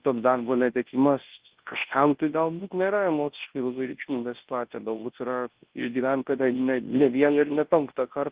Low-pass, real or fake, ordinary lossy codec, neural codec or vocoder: 3.6 kHz; fake; Opus, 16 kbps; codec, 24 kHz, 0.9 kbps, WavTokenizer, large speech release